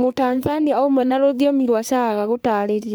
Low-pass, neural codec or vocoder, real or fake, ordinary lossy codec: none; codec, 44.1 kHz, 3.4 kbps, Pupu-Codec; fake; none